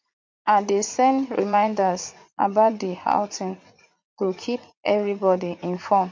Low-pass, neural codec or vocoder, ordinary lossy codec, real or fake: 7.2 kHz; vocoder, 44.1 kHz, 80 mel bands, Vocos; MP3, 48 kbps; fake